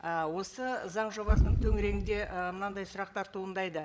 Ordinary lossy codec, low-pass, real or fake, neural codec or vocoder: none; none; fake; codec, 16 kHz, 16 kbps, FreqCodec, larger model